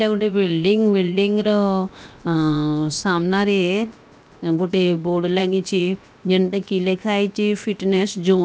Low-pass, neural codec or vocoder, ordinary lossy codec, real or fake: none; codec, 16 kHz, 0.7 kbps, FocalCodec; none; fake